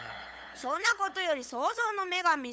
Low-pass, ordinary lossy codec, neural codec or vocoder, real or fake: none; none; codec, 16 kHz, 8 kbps, FunCodec, trained on LibriTTS, 25 frames a second; fake